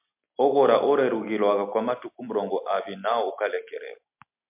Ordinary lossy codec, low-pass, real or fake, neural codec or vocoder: MP3, 32 kbps; 3.6 kHz; real; none